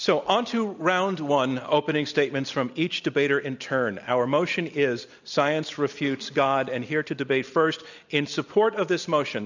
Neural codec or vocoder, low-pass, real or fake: none; 7.2 kHz; real